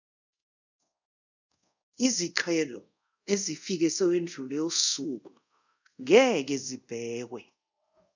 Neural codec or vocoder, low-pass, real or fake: codec, 24 kHz, 0.5 kbps, DualCodec; 7.2 kHz; fake